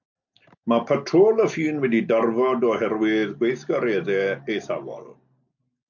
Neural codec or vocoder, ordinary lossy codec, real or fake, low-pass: none; MP3, 64 kbps; real; 7.2 kHz